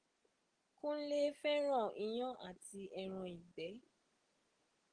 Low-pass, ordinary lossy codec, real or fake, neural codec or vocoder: 9.9 kHz; Opus, 16 kbps; real; none